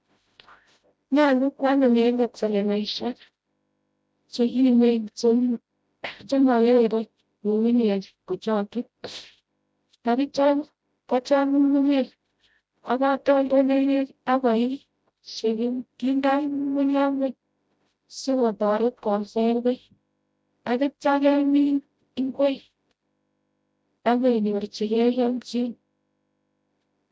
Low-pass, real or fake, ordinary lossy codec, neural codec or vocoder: none; fake; none; codec, 16 kHz, 0.5 kbps, FreqCodec, smaller model